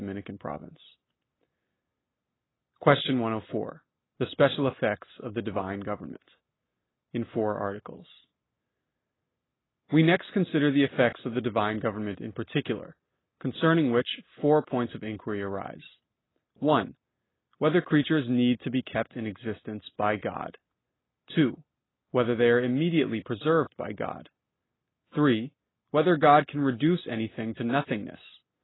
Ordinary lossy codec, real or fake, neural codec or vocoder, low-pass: AAC, 16 kbps; real; none; 7.2 kHz